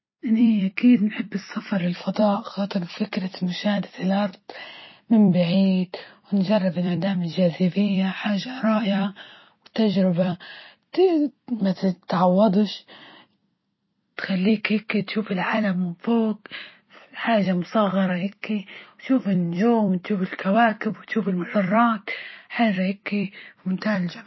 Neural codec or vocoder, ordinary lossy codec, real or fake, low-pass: vocoder, 44.1 kHz, 128 mel bands every 256 samples, BigVGAN v2; MP3, 24 kbps; fake; 7.2 kHz